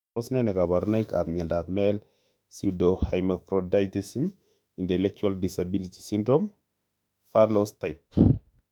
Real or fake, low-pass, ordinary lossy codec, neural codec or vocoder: fake; 19.8 kHz; none; autoencoder, 48 kHz, 32 numbers a frame, DAC-VAE, trained on Japanese speech